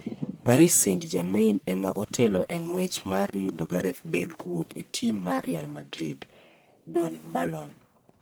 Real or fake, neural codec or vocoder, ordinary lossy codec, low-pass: fake; codec, 44.1 kHz, 1.7 kbps, Pupu-Codec; none; none